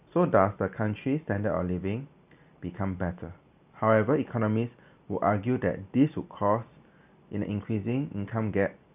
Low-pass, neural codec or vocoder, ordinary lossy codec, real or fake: 3.6 kHz; none; none; real